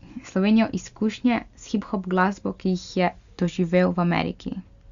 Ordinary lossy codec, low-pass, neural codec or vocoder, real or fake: Opus, 64 kbps; 7.2 kHz; none; real